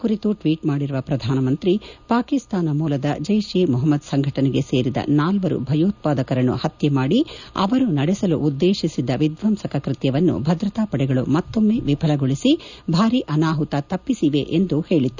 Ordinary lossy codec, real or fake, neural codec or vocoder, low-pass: none; real; none; 7.2 kHz